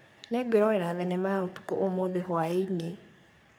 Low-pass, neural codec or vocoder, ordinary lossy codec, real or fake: none; codec, 44.1 kHz, 3.4 kbps, Pupu-Codec; none; fake